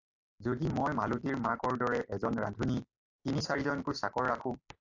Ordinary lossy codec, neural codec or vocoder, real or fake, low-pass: AAC, 48 kbps; none; real; 7.2 kHz